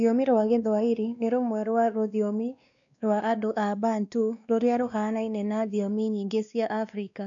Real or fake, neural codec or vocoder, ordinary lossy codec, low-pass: fake; codec, 16 kHz, 2 kbps, X-Codec, WavLM features, trained on Multilingual LibriSpeech; none; 7.2 kHz